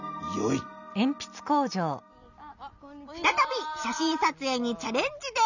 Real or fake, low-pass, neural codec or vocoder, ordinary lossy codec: real; 7.2 kHz; none; none